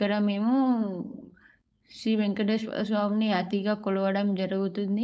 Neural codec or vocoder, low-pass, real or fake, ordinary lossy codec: codec, 16 kHz, 4.8 kbps, FACodec; none; fake; none